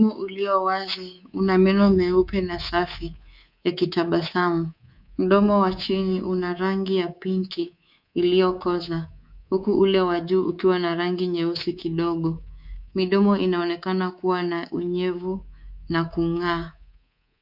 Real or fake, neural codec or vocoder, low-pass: fake; codec, 24 kHz, 3.1 kbps, DualCodec; 5.4 kHz